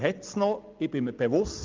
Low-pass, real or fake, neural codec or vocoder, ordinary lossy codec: 7.2 kHz; real; none; Opus, 24 kbps